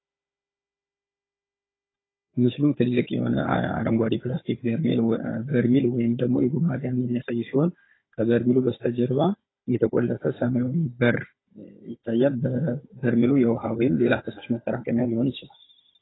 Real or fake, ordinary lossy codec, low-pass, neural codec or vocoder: fake; AAC, 16 kbps; 7.2 kHz; codec, 16 kHz, 16 kbps, FunCodec, trained on Chinese and English, 50 frames a second